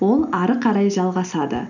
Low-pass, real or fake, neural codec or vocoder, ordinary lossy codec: 7.2 kHz; real; none; none